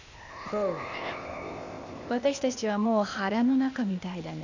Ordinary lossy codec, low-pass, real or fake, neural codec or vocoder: none; 7.2 kHz; fake; codec, 16 kHz, 0.8 kbps, ZipCodec